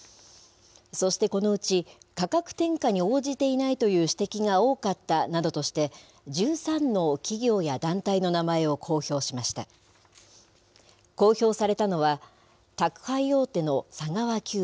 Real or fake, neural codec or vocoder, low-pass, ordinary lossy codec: real; none; none; none